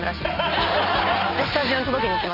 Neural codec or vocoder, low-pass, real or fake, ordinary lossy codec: none; 5.4 kHz; real; none